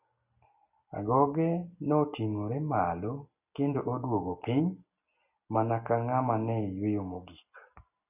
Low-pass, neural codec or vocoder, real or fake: 3.6 kHz; none; real